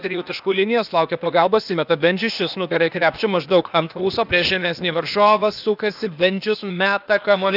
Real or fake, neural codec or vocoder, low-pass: fake; codec, 16 kHz, 0.8 kbps, ZipCodec; 5.4 kHz